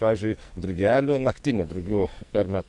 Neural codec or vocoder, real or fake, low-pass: codec, 44.1 kHz, 2.6 kbps, SNAC; fake; 10.8 kHz